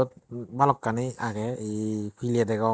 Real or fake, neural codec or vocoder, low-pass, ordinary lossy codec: real; none; none; none